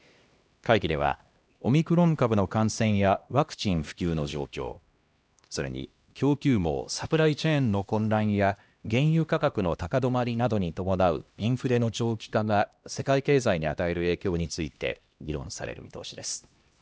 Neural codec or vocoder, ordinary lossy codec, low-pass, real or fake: codec, 16 kHz, 1 kbps, X-Codec, HuBERT features, trained on LibriSpeech; none; none; fake